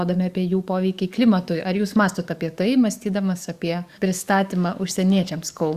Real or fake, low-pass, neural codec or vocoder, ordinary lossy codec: fake; 14.4 kHz; codec, 44.1 kHz, 7.8 kbps, DAC; Opus, 64 kbps